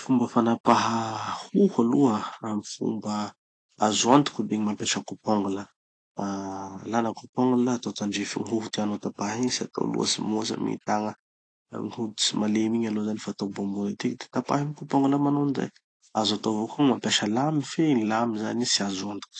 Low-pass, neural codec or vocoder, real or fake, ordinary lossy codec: 9.9 kHz; none; real; AAC, 48 kbps